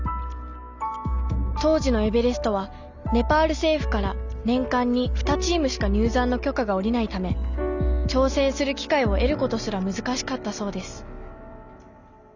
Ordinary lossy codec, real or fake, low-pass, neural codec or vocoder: none; real; 7.2 kHz; none